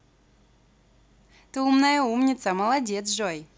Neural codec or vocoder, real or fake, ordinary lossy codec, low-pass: none; real; none; none